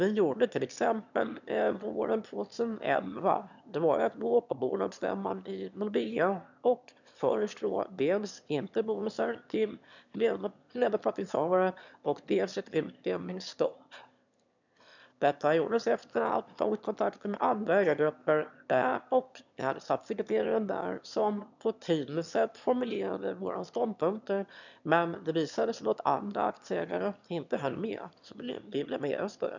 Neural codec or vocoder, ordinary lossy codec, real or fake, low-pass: autoencoder, 22.05 kHz, a latent of 192 numbers a frame, VITS, trained on one speaker; none; fake; 7.2 kHz